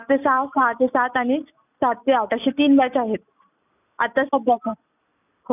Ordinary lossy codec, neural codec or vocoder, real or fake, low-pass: none; none; real; 3.6 kHz